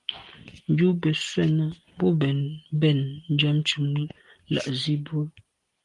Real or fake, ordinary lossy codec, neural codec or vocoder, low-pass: real; Opus, 24 kbps; none; 10.8 kHz